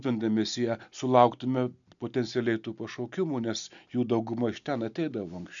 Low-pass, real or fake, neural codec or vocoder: 7.2 kHz; real; none